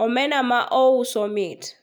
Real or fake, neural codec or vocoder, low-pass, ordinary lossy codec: real; none; none; none